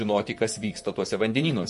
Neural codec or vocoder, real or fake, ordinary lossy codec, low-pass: vocoder, 44.1 kHz, 128 mel bands, Pupu-Vocoder; fake; MP3, 48 kbps; 14.4 kHz